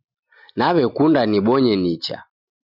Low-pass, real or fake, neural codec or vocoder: 5.4 kHz; real; none